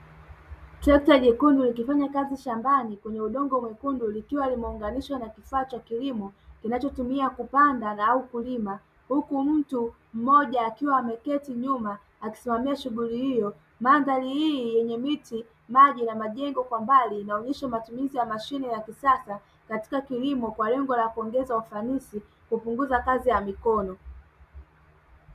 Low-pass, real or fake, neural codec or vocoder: 14.4 kHz; real; none